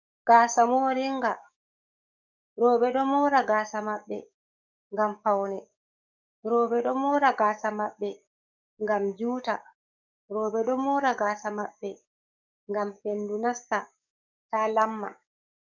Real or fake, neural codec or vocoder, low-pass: fake; codec, 44.1 kHz, 7.8 kbps, DAC; 7.2 kHz